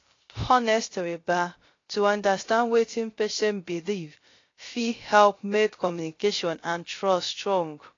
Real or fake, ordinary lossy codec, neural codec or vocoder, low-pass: fake; AAC, 32 kbps; codec, 16 kHz, 0.3 kbps, FocalCodec; 7.2 kHz